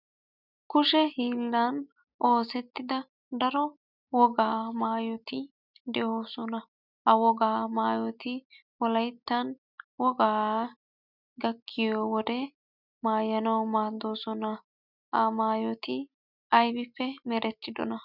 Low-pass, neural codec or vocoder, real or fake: 5.4 kHz; none; real